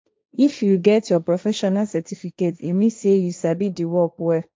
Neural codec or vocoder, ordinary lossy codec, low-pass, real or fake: codec, 16 kHz, 1.1 kbps, Voila-Tokenizer; none; none; fake